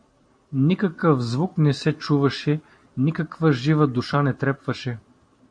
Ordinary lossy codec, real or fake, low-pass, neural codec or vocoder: MP3, 48 kbps; fake; 9.9 kHz; vocoder, 44.1 kHz, 128 mel bands every 256 samples, BigVGAN v2